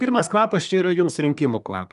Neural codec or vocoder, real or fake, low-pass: codec, 24 kHz, 1 kbps, SNAC; fake; 10.8 kHz